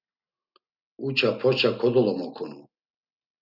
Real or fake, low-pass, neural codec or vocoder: real; 5.4 kHz; none